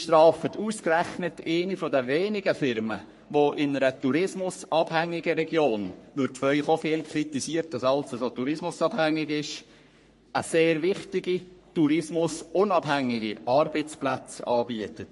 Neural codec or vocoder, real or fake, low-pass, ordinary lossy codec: codec, 44.1 kHz, 3.4 kbps, Pupu-Codec; fake; 14.4 kHz; MP3, 48 kbps